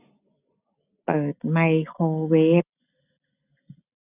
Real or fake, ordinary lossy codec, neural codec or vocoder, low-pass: real; none; none; 3.6 kHz